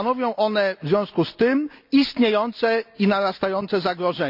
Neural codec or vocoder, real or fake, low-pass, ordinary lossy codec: none; real; 5.4 kHz; none